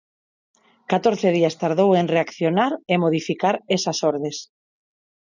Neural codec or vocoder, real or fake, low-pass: none; real; 7.2 kHz